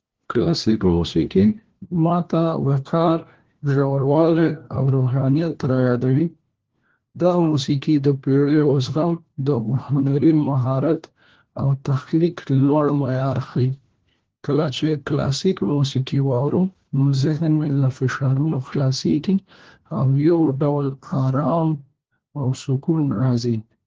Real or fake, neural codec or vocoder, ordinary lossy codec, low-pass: fake; codec, 16 kHz, 1 kbps, FunCodec, trained on LibriTTS, 50 frames a second; Opus, 16 kbps; 7.2 kHz